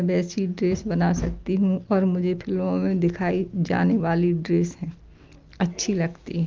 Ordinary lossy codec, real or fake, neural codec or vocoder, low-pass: Opus, 24 kbps; real; none; 7.2 kHz